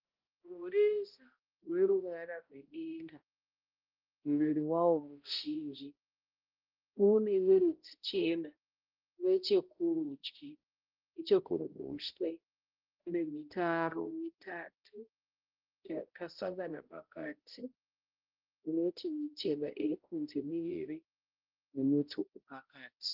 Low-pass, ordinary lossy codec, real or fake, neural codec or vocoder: 5.4 kHz; Opus, 32 kbps; fake; codec, 16 kHz, 0.5 kbps, X-Codec, HuBERT features, trained on balanced general audio